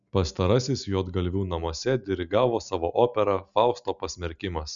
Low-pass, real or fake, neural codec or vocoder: 7.2 kHz; real; none